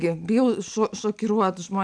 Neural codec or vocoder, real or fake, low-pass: none; real; 9.9 kHz